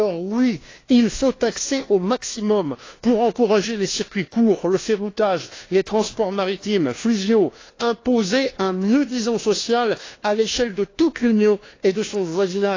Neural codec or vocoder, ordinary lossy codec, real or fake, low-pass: codec, 16 kHz, 1 kbps, FunCodec, trained on LibriTTS, 50 frames a second; AAC, 32 kbps; fake; 7.2 kHz